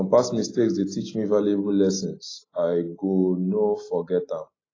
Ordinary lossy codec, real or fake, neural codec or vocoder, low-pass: AAC, 32 kbps; real; none; 7.2 kHz